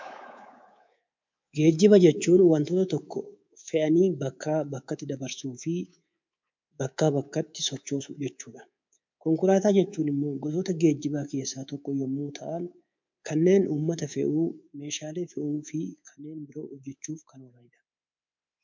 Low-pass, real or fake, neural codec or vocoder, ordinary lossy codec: 7.2 kHz; fake; codec, 24 kHz, 3.1 kbps, DualCodec; MP3, 64 kbps